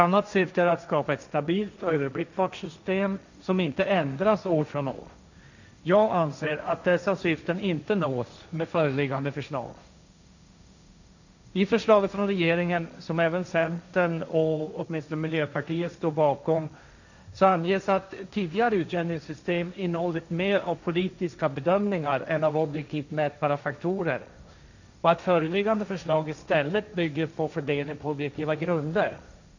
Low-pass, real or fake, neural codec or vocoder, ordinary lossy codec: 7.2 kHz; fake; codec, 16 kHz, 1.1 kbps, Voila-Tokenizer; none